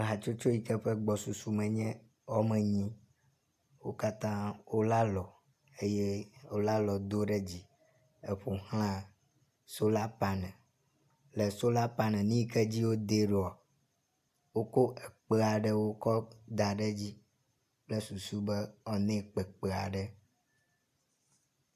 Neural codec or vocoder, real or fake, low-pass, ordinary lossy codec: none; real; 14.4 kHz; AAC, 96 kbps